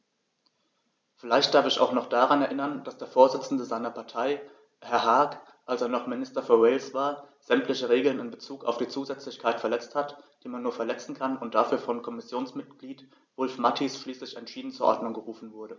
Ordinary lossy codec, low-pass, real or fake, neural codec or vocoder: none; none; real; none